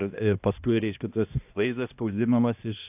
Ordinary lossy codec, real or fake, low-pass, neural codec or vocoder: AAC, 32 kbps; fake; 3.6 kHz; codec, 16 kHz, 1 kbps, X-Codec, HuBERT features, trained on balanced general audio